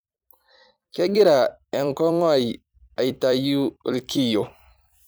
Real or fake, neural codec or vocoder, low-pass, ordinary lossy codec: fake; vocoder, 44.1 kHz, 128 mel bands every 256 samples, BigVGAN v2; none; none